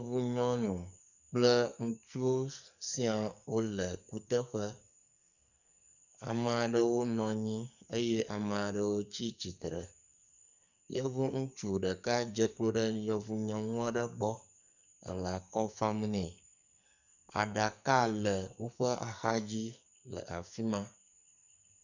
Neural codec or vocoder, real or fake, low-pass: codec, 44.1 kHz, 2.6 kbps, SNAC; fake; 7.2 kHz